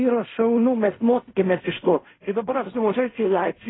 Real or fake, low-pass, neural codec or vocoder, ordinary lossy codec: fake; 7.2 kHz; codec, 16 kHz in and 24 kHz out, 0.4 kbps, LongCat-Audio-Codec, fine tuned four codebook decoder; AAC, 16 kbps